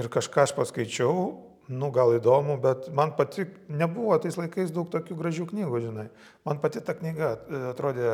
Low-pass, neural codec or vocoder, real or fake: 19.8 kHz; none; real